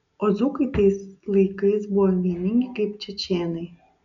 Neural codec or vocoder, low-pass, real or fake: none; 7.2 kHz; real